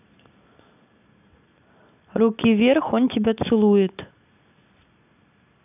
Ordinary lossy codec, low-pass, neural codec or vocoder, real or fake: none; 3.6 kHz; none; real